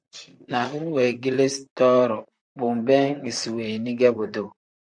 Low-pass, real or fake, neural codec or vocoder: 9.9 kHz; fake; vocoder, 44.1 kHz, 128 mel bands, Pupu-Vocoder